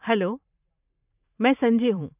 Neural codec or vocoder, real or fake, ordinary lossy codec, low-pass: vocoder, 44.1 kHz, 128 mel bands every 512 samples, BigVGAN v2; fake; none; 3.6 kHz